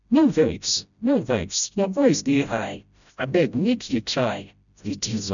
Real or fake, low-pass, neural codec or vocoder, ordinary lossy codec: fake; 7.2 kHz; codec, 16 kHz, 0.5 kbps, FreqCodec, smaller model; none